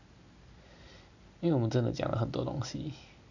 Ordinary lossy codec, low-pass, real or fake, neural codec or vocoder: none; 7.2 kHz; real; none